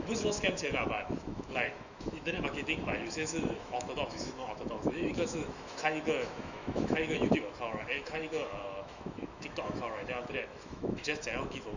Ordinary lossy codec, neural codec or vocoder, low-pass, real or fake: none; autoencoder, 48 kHz, 128 numbers a frame, DAC-VAE, trained on Japanese speech; 7.2 kHz; fake